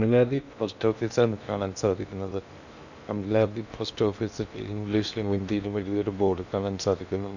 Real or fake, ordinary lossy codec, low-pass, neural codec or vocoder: fake; none; 7.2 kHz; codec, 16 kHz in and 24 kHz out, 0.8 kbps, FocalCodec, streaming, 65536 codes